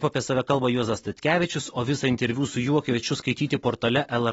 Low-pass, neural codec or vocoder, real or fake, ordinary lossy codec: 19.8 kHz; none; real; AAC, 24 kbps